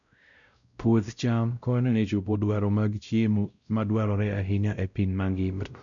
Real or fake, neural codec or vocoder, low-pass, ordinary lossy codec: fake; codec, 16 kHz, 0.5 kbps, X-Codec, WavLM features, trained on Multilingual LibriSpeech; 7.2 kHz; none